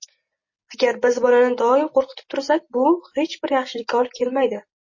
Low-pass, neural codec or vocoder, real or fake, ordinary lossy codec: 7.2 kHz; none; real; MP3, 32 kbps